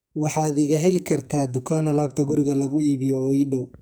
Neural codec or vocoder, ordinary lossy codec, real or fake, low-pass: codec, 44.1 kHz, 2.6 kbps, SNAC; none; fake; none